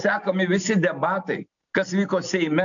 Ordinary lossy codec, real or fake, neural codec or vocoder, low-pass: AAC, 64 kbps; real; none; 7.2 kHz